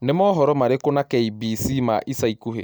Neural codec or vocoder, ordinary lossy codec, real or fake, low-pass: none; none; real; none